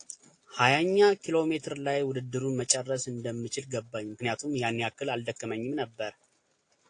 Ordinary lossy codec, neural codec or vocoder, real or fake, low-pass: AAC, 48 kbps; none; real; 9.9 kHz